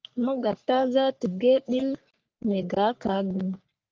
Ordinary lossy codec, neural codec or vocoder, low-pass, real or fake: Opus, 32 kbps; codec, 44.1 kHz, 3.4 kbps, Pupu-Codec; 7.2 kHz; fake